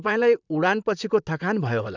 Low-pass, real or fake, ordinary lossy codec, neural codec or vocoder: 7.2 kHz; fake; none; vocoder, 44.1 kHz, 128 mel bands, Pupu-Vocoder